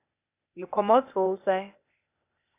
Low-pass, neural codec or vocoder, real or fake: 3.6 kHz; codec, 16 kHz, 0.8 kbps, ZipCodec; fake